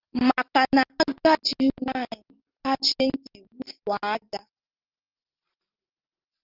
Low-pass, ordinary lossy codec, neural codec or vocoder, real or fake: 5.4 kHz; Opus, 24 kbps; none; real